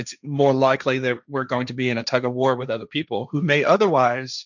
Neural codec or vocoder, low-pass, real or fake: codec, 16 kHz, 1.1 kbps, Voila-Tokenizer; 7.2 kHz; fake